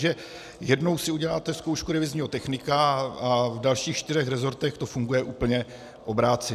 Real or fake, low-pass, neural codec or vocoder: real; 14.4 kHz; none